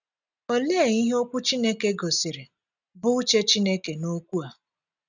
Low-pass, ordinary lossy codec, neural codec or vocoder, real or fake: 7.2 kHz; none; none; real